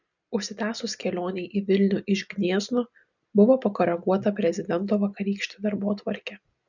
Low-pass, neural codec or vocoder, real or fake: 7.2 kHz; none; real